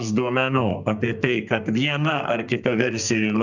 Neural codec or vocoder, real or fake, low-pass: codec, 32 kHz, 1.9 kbps, SNAC; fake; 7.2 kHz